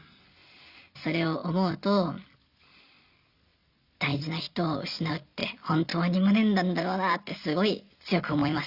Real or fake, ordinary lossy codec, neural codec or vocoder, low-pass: real; Opus, 64 kbps; none; 5.4 kHz